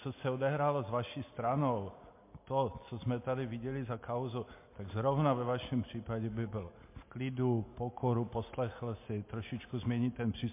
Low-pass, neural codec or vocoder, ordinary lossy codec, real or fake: 3.6 kHz; none; MP3, 24 kbps; real